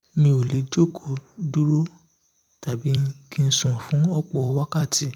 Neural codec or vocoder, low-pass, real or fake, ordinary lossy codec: vocoder, 44.1 kHz, 128 mel bands every 256 samples, BigVGAN v2; 19.8 kHz; fake; none